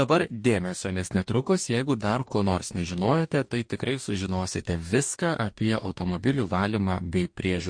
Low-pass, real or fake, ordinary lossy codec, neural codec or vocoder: 9.9 kHz; fake; MP3, 48 kbps; codec, 44.1 kHz, 2.6 kbps, DAC